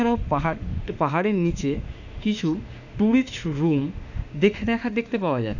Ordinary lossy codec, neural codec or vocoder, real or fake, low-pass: none; autoencoder, 48 kHz, 32 numbers a frame, DAC-VAE, trained on Japanese speech; fake; 7.2 kHz